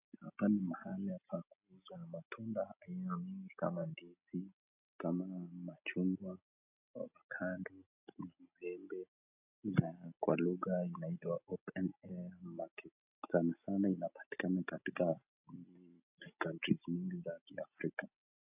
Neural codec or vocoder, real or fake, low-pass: none; real; 3.6 kHz